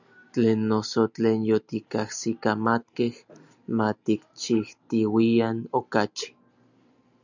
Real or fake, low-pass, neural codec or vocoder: real; 7.2 kHz; none